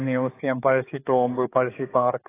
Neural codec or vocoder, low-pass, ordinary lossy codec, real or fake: codec, 16 kHz, 4 kbps, X-Codec, HuBERT features, trained on general audio; 3.6 kHz; AAC, 16 kbps; fake